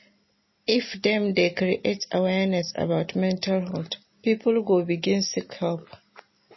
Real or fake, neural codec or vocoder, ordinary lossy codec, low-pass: real; none; MP3, 24 kbps; 7.2 kHz